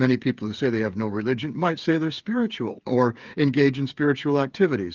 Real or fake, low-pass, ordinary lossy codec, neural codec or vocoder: fake; 7.2 kHz; Opus, 32 kbps; codec, 16 kHz, 8 kbps, FreqCodec, smaller model